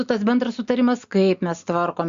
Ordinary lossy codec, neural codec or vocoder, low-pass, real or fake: Opus, 64 kbps; none; 7.2 kHz; real